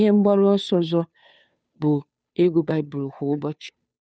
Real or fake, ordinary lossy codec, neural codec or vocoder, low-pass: fake; none; codec, 16 kHz, 2 kbps, FunCodec, trained on Chinese and English, 25 frames a second; none